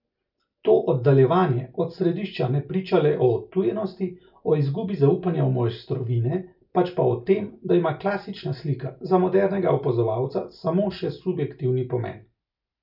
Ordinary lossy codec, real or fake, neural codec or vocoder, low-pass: none; real; none; 5.4 kHz